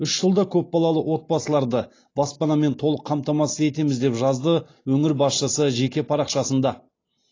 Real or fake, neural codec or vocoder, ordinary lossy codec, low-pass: real; none; AAC, 32 kbps; 7.2 kHz